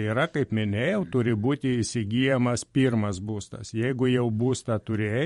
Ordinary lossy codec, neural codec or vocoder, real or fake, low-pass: MP3, 48 kbps; vocoder, 44.1 kHz, 128 mel bands every 512 samples, BigVGAN v2; fake; 19.8 kHz